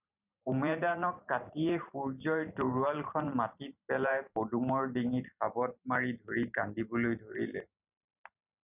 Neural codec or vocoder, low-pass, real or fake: vocoder, 44.1 kHz, 128 mel bands every 512 samples, BigVGAN v2; 3.6 kHz; fake